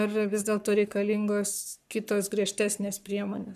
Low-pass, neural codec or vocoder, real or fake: 14.4 kHz; codec, 44.1 kHz, 7.8 kbps, Pupu-Codec; fake